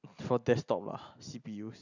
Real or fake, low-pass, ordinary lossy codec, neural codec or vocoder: real; 7.2 kHz; none; none